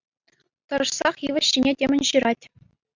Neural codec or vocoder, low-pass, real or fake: none; 7.2 kHz; real